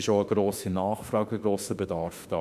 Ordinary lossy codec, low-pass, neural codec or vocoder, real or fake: MP3, 96 kbps; 14.4 kHz; autoencoder, 48 kHz, 32 numbers a frame, DAC-VAE, trained on Japanese speech; fake